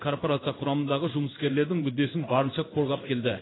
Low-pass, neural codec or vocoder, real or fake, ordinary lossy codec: 7.2 kHz; codec, 16 kHz in and 24 kHz out, 1 kbps, XY-Tokenizer; fake; AAC, 16 kbps